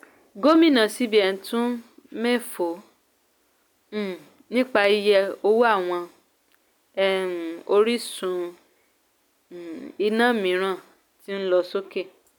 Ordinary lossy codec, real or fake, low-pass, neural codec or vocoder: none; real; none; none